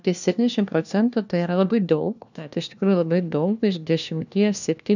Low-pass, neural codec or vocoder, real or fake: 7.2 kHz; codec, 16 kHz, 1 kbps, FunCodec, trained on LibriTTS, 50 frames a second; fake